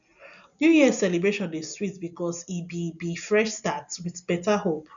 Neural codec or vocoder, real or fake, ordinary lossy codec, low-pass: none; real; none; 7.2 kHz